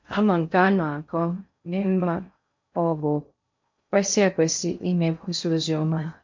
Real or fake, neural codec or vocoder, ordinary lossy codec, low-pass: fake; codec, 16 kHz in and 24 kHz out, 0.6 kbps, FocalCodec, streaming, 4096 codes; MP3, 48 kbps; 7.2 kHz